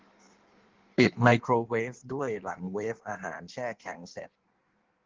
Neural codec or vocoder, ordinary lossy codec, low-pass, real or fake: codec, 16 kHz in and 24 kHz out, 1.1 kbps, FireRedTTS-2 codec; Opus, 16 kbps; 7.2 kHz; fake